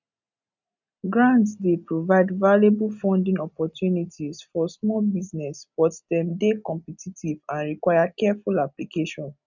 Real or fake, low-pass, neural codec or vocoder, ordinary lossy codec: real; 7.2 kHz; none; none